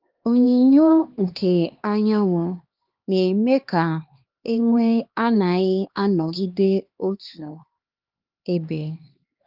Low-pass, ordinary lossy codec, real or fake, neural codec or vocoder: 5.4 kHz; Opus, 32 kbps; fake; codec, 16 kHz, 2 kbps, X-Codec, HuBERT features, trained on LibriSpeech